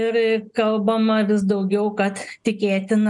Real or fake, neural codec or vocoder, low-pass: real; none; 10.8 kHz